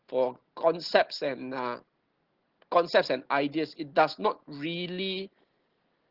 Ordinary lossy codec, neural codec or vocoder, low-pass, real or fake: Opus, 16 kbps; none; 5.4 kHz; real